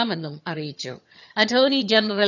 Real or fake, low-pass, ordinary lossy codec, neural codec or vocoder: fake; 7.2 kHz; none; vocoder, 22.05 kHz, 80 mel bands, HiFi-GAN